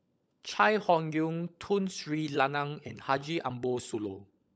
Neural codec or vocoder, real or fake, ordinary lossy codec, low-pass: codec, 16 kHz, 16 kbps, FunCodec, trained on LibriTTS, 50 frames a second; fake; none; none